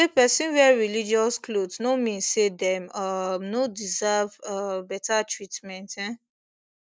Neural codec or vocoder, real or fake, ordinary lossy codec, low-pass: none; real; none; none